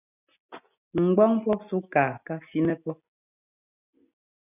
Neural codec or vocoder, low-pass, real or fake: none; 3.6 kHz; real